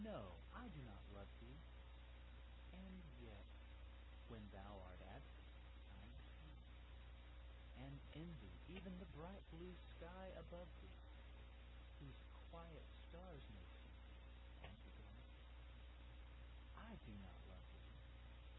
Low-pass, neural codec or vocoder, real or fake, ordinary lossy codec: 7.2 kHz; none; real; AAC, 16 kbps